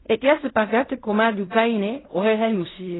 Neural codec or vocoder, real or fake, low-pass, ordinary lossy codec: codec, 16 kHz in and 24 kHz out, 0.4 kbps, LongCat-Audio-Codec, fine tuned four codebook decoder; fake; 7.2 kHz; AAC, 16 kbps